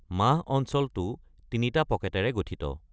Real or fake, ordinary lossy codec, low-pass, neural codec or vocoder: real; none; none; none